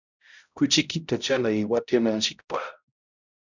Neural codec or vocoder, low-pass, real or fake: codec, 16 kHz, 0.5 kbps, X-Codec, HuBERT features, trained on balanced general audio; 7.2 kHz; fake